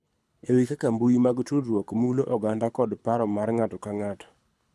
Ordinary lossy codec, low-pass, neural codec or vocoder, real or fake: none; none; codec, 24 kHz, 6 kbps, HILCodec; fake